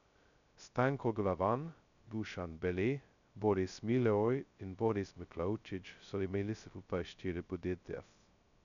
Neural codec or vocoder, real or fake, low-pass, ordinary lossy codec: codec, 16 kHz, 0.2 kbps, FocalCodec; fake; 7.2 kHz; none